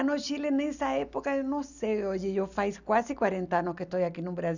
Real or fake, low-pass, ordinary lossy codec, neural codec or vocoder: real; 7.2 kHz; none; none